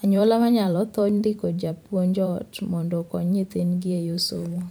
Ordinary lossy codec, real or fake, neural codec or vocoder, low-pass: none; fake; vocoder, 44.1 kHz, 128 mel bands every 512 samples, BigVGAN v2; none